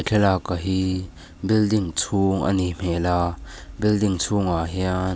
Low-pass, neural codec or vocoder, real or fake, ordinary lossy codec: none; none; real; none